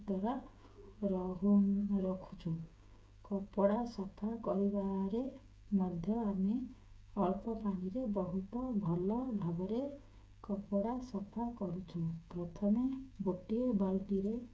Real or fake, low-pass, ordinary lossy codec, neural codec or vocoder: fake; none; none; codec, 16 kHz, 16 kbps, FreqCodec, smaller model